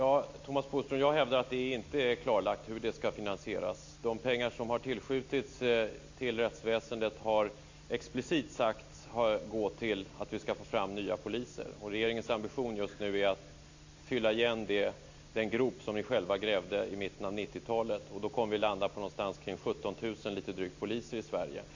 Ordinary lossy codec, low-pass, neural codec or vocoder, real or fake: AAC, 48 kbps; 7.2 kHz; none; real